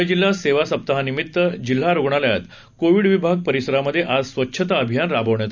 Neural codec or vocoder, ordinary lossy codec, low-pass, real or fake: none; none; 7.2 kHz; real